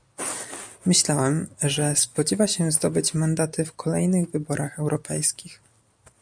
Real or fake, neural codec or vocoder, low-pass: real; none; 9.9 kHz